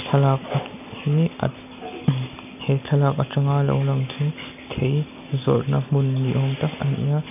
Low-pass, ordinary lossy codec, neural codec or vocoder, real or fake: 3.6 kHz; none; none; real